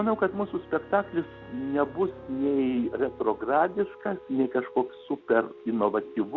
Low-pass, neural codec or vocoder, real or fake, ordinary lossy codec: 7.2 kHz; none; real; Opus, 64 kbps